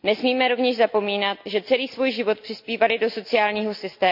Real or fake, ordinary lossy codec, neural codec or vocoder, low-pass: real; none; none; 5.4 kHz